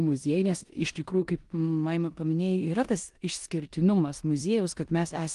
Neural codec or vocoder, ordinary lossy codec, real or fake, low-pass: codec, 16 kHz in and 24 kHz out, 0.9 kbps, LongCat-Audio-Codec, four codebook decoder; Opus, 24 kbps; fake; 10.8 kHz